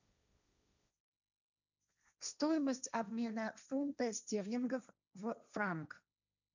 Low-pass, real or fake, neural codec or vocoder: 7.2 kHz; fake; codec, 16 kHz, 1.1 kbps, Voila-Tokenizer